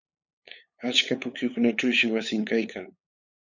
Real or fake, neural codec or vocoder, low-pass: fake; codec, 16 kHz, 8 kbps, FunCodec, trained on LibriTTS, 25 frames a second; 7.2 kHz